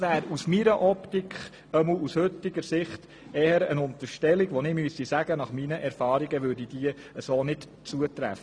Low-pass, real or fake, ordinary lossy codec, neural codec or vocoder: 9.9 kHz; real; none; none